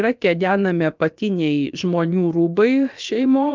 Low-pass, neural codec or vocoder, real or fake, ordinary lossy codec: 7.2 kHz; codec, 16 kHz, about 1 kbps, DyCAST, with the encoder's durations; fake; Opus, 24 kbps